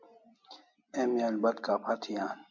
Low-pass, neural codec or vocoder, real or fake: 7.2 kHz; none; real